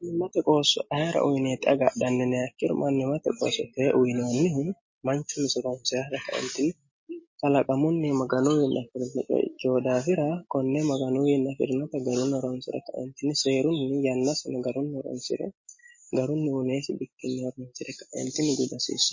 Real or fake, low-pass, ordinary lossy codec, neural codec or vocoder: real; 7.2 kHz; MP3, 32 kbps; none